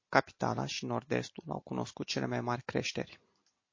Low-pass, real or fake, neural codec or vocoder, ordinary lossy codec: 7.2 kHz; real; none; MP3, 32 kbps